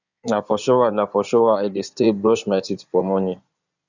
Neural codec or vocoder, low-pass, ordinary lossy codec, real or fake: codec, 16 kHz in and 24 kHz out, 2.2 kbps, FireRedTTS-2 codec; 7.2 kHz; none; fake